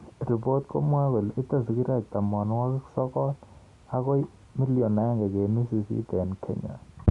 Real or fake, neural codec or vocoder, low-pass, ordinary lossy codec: real; none; 10.8 kHz; Opus, 64 kbps